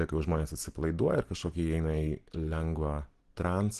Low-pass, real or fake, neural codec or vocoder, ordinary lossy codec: 10.8 kHz; real; none; Opus, 16 kbps